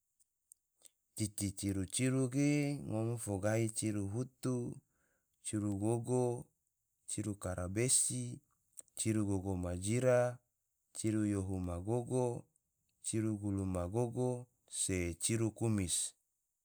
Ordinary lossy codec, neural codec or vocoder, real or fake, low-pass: none; none; real; none